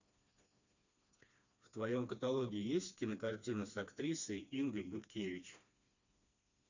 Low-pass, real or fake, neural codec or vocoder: 7.2 kHz; fake; codec, 16 kHz, 2 kbps, FreqCodec, smaller model